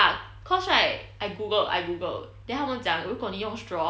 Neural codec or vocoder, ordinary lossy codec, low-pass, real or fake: none; none; none; real